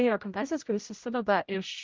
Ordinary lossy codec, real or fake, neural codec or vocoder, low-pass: Opus, 32 kbps; fake; codec, 16 kHz, 0.5 kbps, X-Codec, HuBERT features, trained on general audio; 7.2 kHz